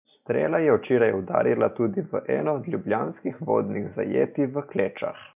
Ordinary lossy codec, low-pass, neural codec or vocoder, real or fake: none; 3.6 kHz; none; real